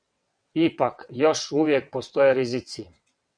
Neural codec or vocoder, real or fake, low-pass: vocoder, 22.05 kHz, 80 mel bands, WaveNeXt; fake; 9.9 kHz